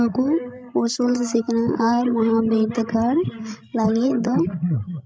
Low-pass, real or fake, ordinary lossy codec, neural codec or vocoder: none; fake; none; codec, 16 kHz, 16 kbps, FreqCodec, larger model